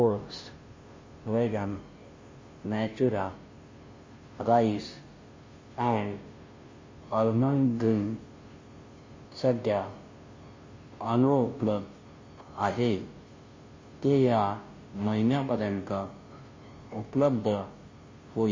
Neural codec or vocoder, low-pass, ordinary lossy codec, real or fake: codec, 16 kHz, 0.5 kbps, FunCodec, trained on Chinese and English, 25 frames a second; 7.2 kHz; MP3, 32 kbps; fake